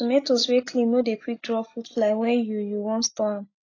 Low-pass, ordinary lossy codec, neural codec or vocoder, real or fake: 7.2 kHz; AAC, 32 kbps; none; real